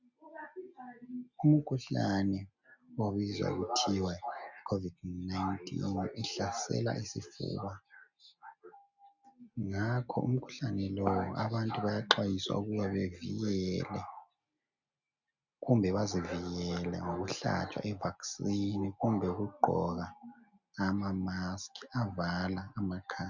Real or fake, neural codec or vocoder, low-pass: real; none; 7.2 kHz